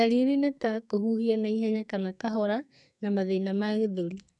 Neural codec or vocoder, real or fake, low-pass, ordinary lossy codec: codec, 44.1 kHz, 2.6 kbps, SNAC; fake; 10.8 kHz; none